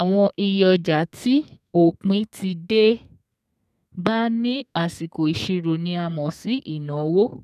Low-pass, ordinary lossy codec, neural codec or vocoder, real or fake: 14.4 kHz; none; codec, 32 kHz, 1.9 kbps, SNAC; fake